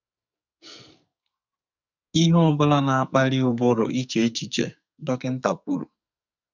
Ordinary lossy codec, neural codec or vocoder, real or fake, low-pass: none; codec, 44.1 kHz, 2.6 kbps, SNAC; fake; 7.2 kHz